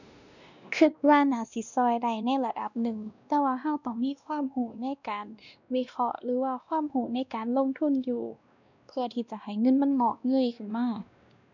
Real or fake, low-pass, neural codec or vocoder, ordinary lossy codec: fake; 7.2 kHz; codec, 16 kHz, 1 kbps, X-Codec, WavLM features, trained on Multilingual LibriSpeech; none